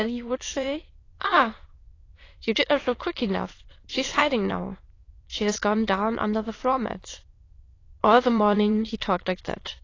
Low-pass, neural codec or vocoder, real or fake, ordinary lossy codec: 7.2 kHz; autoencoder, 22.05 kHz, a latent of 192 numbers a frame, VITS, trained on many speakers; fake; AAC, 32 kbps